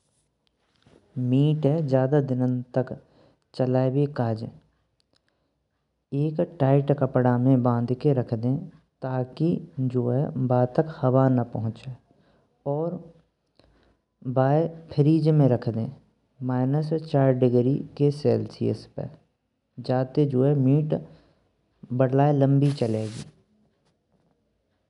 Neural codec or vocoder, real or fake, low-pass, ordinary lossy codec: none; real; 10.8 kHz; none